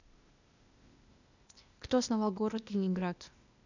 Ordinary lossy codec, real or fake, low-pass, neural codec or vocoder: none; fake; 7.2 kHz; codec, 16 kHz, 0.8 kbps, ZipCodec